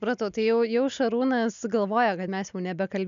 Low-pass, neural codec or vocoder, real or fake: 7.2 kHz; none; real